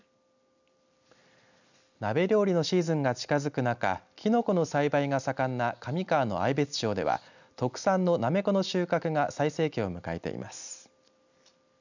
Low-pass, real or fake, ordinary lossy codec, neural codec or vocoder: 7.2 kHz; real; none; none